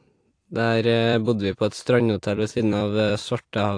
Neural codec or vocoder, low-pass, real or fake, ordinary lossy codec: vocoder, 44.1 kHz, 128 mel bands every 256 samples, BigVGAN v2; 9.9 kHz; fake; AAC, 48 kbps